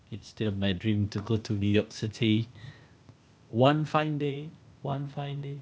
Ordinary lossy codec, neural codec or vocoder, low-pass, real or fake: none; codec, 16 kHz, 0.8 kbps, ZipCodec; none; fake